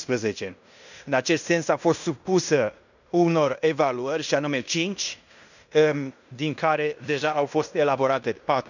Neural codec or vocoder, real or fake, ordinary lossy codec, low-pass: codec, 16 kHz in and 24 kHz out, 0.9 kbps, LongCat-Audio-Codec, fine tuned four codebook decoder; fake; none; 7.2 kHz